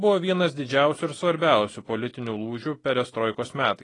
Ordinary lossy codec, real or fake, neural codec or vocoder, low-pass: AAC, 32 kbps; real; none; 10.8 kHz